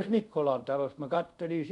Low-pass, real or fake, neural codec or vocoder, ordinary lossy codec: 10.8 kHz; fake; codec, 24 kHz, 0.5 kbps, DualCodec; none